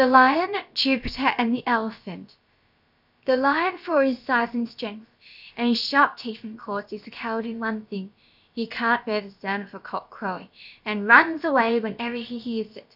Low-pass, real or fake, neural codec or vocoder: 5.4 kHz; fake; codec, 16 kHz, about 1 kbps, DyCAST, with the encoder's durations